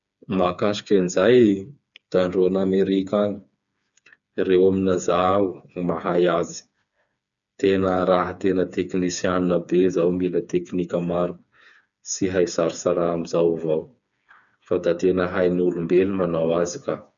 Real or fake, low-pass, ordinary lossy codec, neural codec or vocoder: fake; 7.2 kHz; none; codec, 16 kHz, 4 kbps, FreqCodec, smaller model